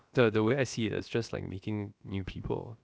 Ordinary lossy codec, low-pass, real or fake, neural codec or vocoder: none; none; fake; codec, 16 kHz, about 1 kbps, DyCAST, with the encoder's durations